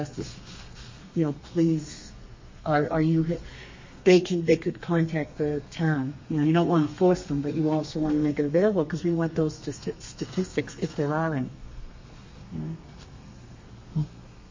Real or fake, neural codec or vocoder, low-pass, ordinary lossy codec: fake; codec, 32 kHz, 1.9 kbps, SNAC; 7.2 kHz; MP3, 32 kbps